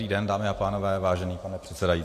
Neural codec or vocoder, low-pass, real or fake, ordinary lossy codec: none; 14.4 kHz; real; AAC, 64 kbps